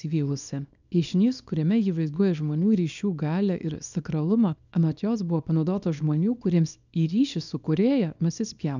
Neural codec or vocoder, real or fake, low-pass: codec, 24 kHz, 0.9 kbps, WavTokenizer, small release; fake; 7.2 kHz